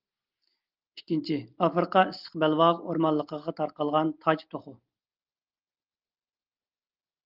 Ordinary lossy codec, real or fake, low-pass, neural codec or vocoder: Opus, 32 kbps; real; 5.4 kHz; none